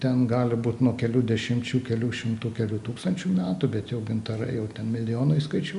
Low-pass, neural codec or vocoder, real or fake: 10.8 kHz; none; real